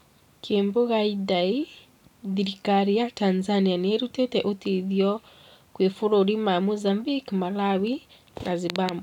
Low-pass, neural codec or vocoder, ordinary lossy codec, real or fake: 19.8 kHz; none; none; real